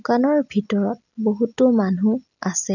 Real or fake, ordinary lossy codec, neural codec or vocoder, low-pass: real; none; none; 7.2 kHz